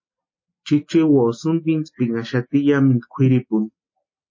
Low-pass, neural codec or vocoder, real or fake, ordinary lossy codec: 7.2 kHz; none; real; MP3, 32 kbps